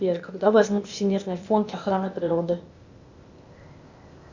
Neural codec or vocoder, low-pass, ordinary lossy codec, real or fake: codec, 16 kHz, 0.8 kbps, ZipCodec; 7.2 kHz; Opus, 64 kbps; fake